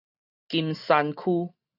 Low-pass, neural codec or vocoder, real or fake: 5.4 kHz; none; real